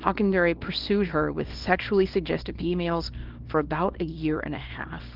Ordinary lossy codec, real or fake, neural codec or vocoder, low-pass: Opus, 16 kbps; fake; codec, 24 kHz, 0.9 kbps, WavTokenizer, small release; 5.4 kHz